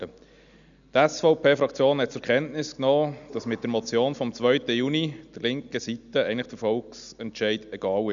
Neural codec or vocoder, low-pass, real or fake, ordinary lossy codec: none; 7.2 kHz; real; none